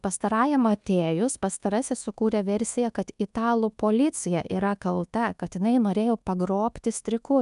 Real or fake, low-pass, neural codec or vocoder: fake; 10.8 kHz; codec, 24 kHz, 1.2 kbps, DualCodec